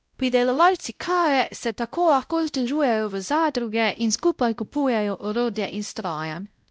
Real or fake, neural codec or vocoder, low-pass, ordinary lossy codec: fake; codec, 16 kHz, 0.5 kbps, X-Codec, WavLM features, trained on Multilingual LibriSpeech; none; none